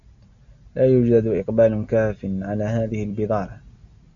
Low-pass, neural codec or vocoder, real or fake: 7.2 kHz; none; real